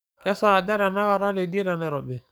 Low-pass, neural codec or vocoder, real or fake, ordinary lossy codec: none; codec, 44.1 kHz, 7.8 kbps, DAC; fake; none